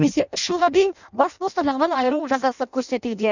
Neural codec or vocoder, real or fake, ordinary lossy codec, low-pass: codec, 16 kHz in and 24 kHz out, 0.6 kbps, FireRedTTS-2 codec; fake; none; 7.2 kHz